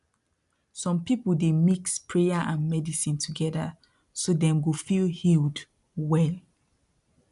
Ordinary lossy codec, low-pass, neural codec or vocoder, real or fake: none; 10.8 kHz; none; real